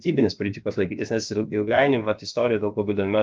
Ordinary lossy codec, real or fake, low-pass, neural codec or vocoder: Opus, 24 kbps; fake; 7.2 kHz; codec, 16 kHz, about 1 kbps, DyCAST, with the encoder's durations